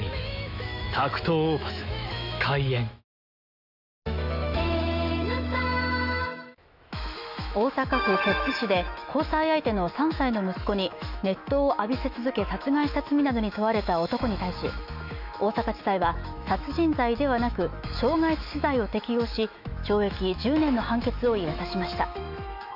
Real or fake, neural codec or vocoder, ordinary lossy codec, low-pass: fake; autoencoder, 48 kHz, 128 numbers a frame, DAC-VAE, trained on Japanese speech; none; 5.4 kHz